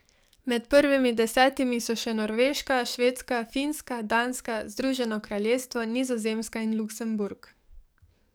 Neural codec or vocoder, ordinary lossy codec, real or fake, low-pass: codec, 44.1 kHz, 7.8 kbps, DAC; none; fake; none